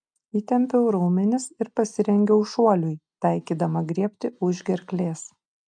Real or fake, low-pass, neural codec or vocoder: real; 9.9 kHz; none